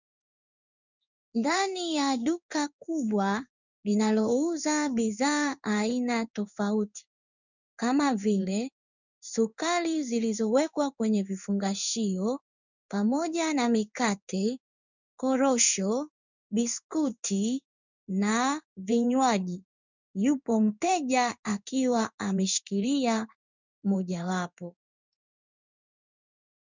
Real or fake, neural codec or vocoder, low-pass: fake; codec, 16 kHz in and 24 kHz out, 1 kbps, XY-Tokenizer; 7.2 kHz